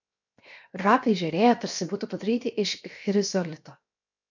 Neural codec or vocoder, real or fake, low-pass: codec, 16 kHz, 0.7 kbps, FocalCodec; fake; 7.2 kHz